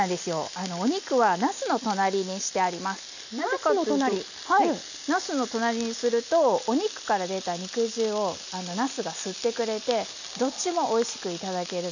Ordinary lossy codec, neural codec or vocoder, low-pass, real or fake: none; none; 7.2 kHz; real